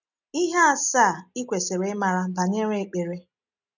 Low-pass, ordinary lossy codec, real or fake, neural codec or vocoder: 7.2 kHz; none; real; none